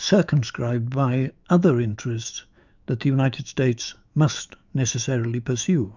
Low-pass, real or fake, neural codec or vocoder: 7.2 kHz; fake; autoencoder, 48 kHz, 128 numbers a frame, DAC-VAE, trained on Japanese speech